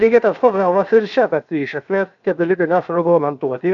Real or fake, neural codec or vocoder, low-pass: fake; codec, 16 kHz, 0.7 kbps, FocalCodec; 7.2 kHz